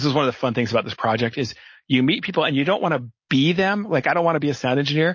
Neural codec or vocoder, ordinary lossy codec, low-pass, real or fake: none; MP3, 32 kbps; 7.2 kHz; real